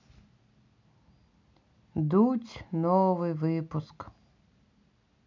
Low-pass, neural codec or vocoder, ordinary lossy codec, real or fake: 7.2 kHz; none; MP3, 64 kbps; real